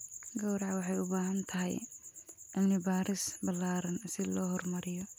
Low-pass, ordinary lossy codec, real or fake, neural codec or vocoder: none; none; real; none